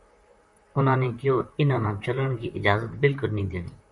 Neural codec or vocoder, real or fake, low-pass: vocoder, 44.1 kHz, 128 mel bands, Pupu-Vocoder; fake; 10.8 kHz